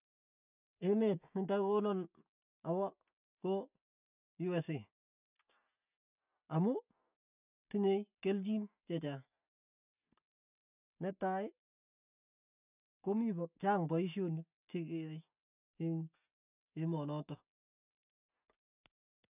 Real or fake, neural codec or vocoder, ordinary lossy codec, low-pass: real; none; none; 3.6 kHz